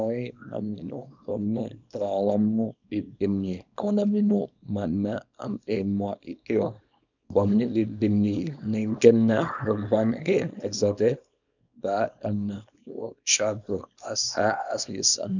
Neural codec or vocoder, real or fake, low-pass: codec, 24 kHz, 0.9 kbps, WavTokenizer, small release; fake; 7.2 kHz